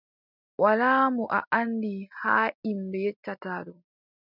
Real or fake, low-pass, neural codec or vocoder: real; 5.4 kHz; none